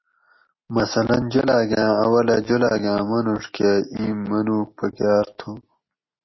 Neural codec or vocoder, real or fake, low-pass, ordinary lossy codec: none; real; 7.2 kHz; MP3, 24 kbps